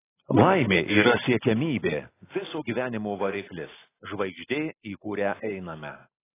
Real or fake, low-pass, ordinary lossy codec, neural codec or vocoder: real; 3.6 kHz; AAC, 16 kbps; none